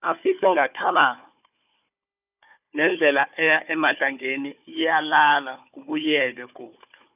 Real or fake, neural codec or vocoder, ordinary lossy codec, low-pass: fake; codec, 16 kHz, 4 kbps, FunCodec, trained on Chinese and English, 50 frames a second; none; 3.6 kHz